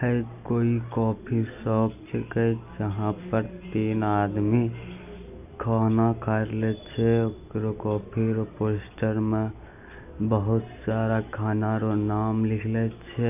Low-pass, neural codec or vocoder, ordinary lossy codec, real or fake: 3.6 kHz; none; none; real